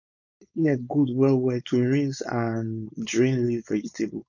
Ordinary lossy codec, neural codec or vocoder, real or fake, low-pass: none; codec, 16 kHz, 4.8 kbps, FACodec; fake; 7.2 kHz